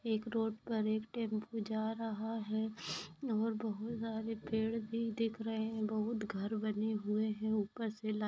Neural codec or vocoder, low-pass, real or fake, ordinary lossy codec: none; none; real; none